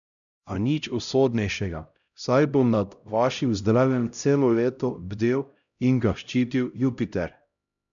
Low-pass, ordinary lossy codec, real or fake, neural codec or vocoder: 7.2 kHz; none; fake; codec, 16 kHz, 0.5 kbps, X-Codec, HuBERT features, trained on LibriSpeech